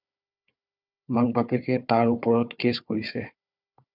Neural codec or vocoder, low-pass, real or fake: codec, 16 kHz, 4 kbps, FunCodec, trained on Chinese and English, 50 frames a second; 5.4 kHz; fake